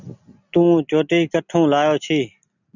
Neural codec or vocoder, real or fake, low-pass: none; real; 7.2 kHz